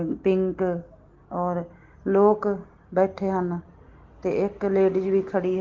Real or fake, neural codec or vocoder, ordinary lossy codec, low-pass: real; none; Opus, 16 kbps; 7.2 kHz